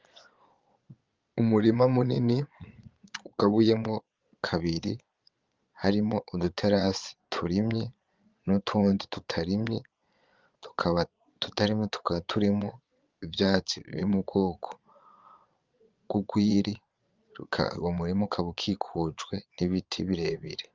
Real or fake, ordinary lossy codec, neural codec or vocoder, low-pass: fake; Opus, 32 kbps; vocoder, 22.05 kHz, 80 mel bands, Vocos; 7.2 kHz